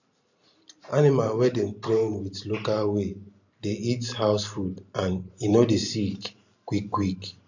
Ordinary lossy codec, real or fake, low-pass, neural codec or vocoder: none; fake; 7.2 kHz; vocoder, 44.1 kHz, 128 mel bands every 512 samples, BigVGAN v2